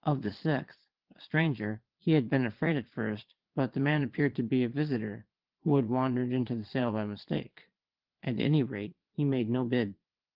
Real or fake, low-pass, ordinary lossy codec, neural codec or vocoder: fake; 5.4 kHz; Opus, 16 kbps; vocoder, 22.05 kHz, 80 mel bands, Vocos